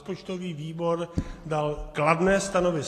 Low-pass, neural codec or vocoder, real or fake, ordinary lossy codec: 14.4 kHz; none; real; AAC, 48 kbps